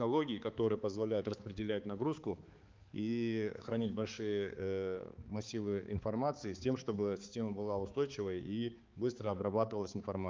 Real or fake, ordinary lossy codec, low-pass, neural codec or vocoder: fake; Opus, 32 kbps; 7.2 kHz; codec, 16 kHz, 4 kbps, X-Codec, HuBERT features, trained on balanced general audio